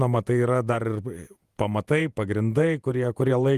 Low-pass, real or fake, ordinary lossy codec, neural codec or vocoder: 14.4 kHz; fake; Opus, 32 kbps; vocoder, 48 kHz, 128 mel bands, Vocos